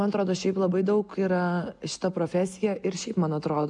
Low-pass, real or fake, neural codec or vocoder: 10.8 kHz; real; none